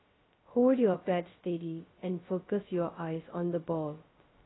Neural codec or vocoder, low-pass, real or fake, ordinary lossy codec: codec, 16 kHz, 0.2 kbps, FocalCodec; 7.2 kHz; fake; AAC, 16 kbps